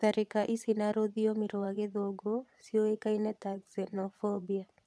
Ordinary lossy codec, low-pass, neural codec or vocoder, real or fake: none; none; vocoder, 22.05 kHz, 80 mel bands, Vocos; fake